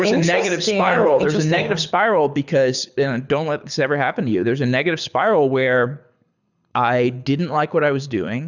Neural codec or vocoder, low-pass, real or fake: codec, 24 kHz, 6 kbps, HILCodec; 7.2 kHz; fake